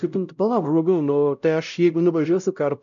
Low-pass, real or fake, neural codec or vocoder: 7.2 kHz; fake; codec, 16 kHz, 0.5 kbps, X-Codec, WavLM features, trained on Multilingual LibriSpeech